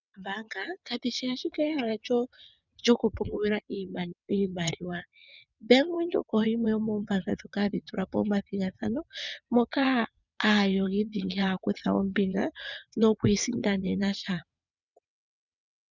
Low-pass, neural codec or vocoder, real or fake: 7.2 kHz; vocoder, 22.05 kHz, 80 mel bands, WaveNeXt; fake